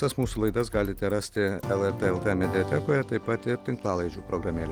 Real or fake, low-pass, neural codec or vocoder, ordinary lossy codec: real; 19.8 kHz; none; Opus, 24 kbps